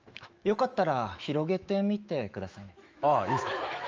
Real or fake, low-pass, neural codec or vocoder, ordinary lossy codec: fake; 7.2 kHz; autoencoder, 48 kHz, 128 numbers a frame, DAC-VAE, trained on Japanese speech; Opus, 24 kbps